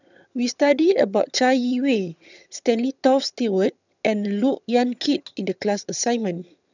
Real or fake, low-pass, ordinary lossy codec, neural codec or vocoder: fake; 7.2 kHz; none; vocoder, 22.05 kHz, 80 mel bands, HiFi-GAN